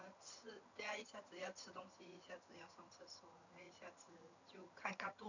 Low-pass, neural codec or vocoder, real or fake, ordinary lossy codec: 7.2 kHz; vocoder, 22.05 kHz, 80 mel bands, HiFi-GAN; fake; none